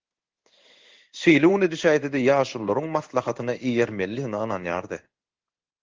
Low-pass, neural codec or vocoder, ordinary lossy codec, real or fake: 7.2 kHz; codec, 16 kHz in and 24 kHz out, 1 kbps, XY-Tokenizer; Opus, 16 kbps; fake